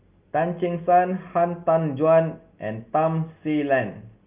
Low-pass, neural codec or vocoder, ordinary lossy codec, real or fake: 3.6 kHz; none; Opus, 24 kbps; real